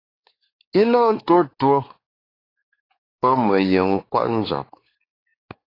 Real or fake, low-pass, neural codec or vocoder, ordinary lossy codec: fake; 5.4 kHz; codec, 16 kHz, 4 kbps, X-Codec, WavLM features, trained on Multilingual LibriSpeech; AAC, 24 kbps